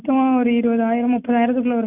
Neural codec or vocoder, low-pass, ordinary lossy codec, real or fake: none; 3.6 kHz; none; real